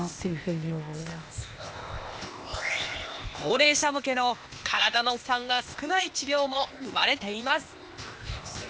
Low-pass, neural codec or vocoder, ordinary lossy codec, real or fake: none; codec, 16 kHz, 0.8 kbps, ZipCodec; none; fake